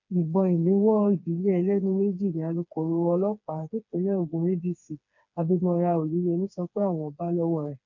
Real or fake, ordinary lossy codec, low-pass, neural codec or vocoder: fake; none; 7.2 kHz; codec, 16 kHz, 4 kbps, FreqCodec, smaller model